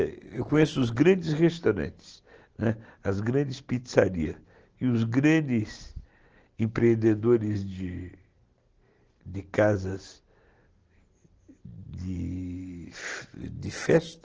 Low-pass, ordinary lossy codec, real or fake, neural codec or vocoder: 7.2 kHz; Opus, 16 kbps; real; none